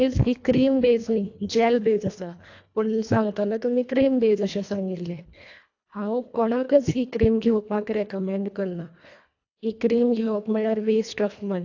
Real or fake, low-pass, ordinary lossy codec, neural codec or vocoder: fake; 7.2 kHz; AAC, 48 kbps; codec, 24 kHz, 1.5 kbps, HILCodec